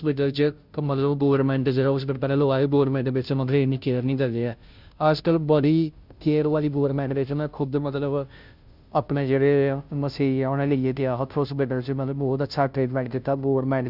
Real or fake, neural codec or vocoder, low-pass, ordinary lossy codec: fake; codec, 16 kHz, 0.5 kbps, FunCodec, trained on Chinese and English, 25 frames a second; 5.4 kHz; Opus, 64 kbps